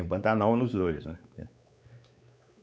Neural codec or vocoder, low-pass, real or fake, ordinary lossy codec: codec, 16 kHz, 4 kbps, X-Codec, WavLM features, trained on Multilingual LibriSpeech; none; fake; none